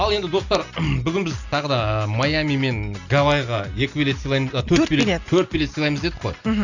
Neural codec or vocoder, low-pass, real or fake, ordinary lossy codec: none; 7.2 kHz; real; none